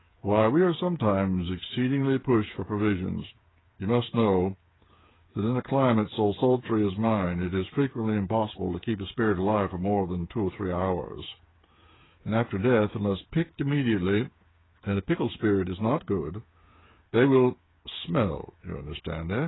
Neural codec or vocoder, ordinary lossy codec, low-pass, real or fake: codec, 16 kHz, 8 kbps, FreqCodec, smaller model; AAC, 16 kbps; 7.2 kHz; fake